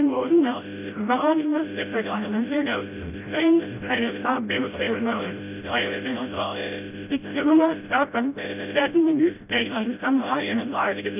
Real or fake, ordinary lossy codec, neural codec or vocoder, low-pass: fake; none; codec, 16 kHz, 0.5 kbps, FreqCodec, smaller model; 3.6 kHz